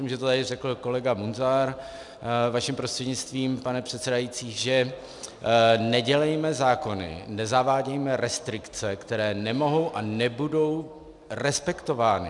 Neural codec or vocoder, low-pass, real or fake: none; 10.8 kHz; real